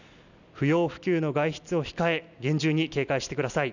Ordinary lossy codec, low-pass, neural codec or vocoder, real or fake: none; 7.2 kHz; none; real